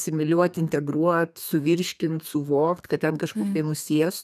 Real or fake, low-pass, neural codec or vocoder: fake; 14.4 kHz; codec, 32 kHz, 1.9 kbps, SNAC